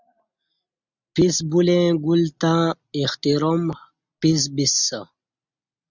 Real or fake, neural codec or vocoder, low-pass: real; none; 7.2 kHz